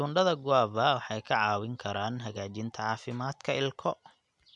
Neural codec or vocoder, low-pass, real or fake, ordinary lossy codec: none; 10.8 kHz; real; none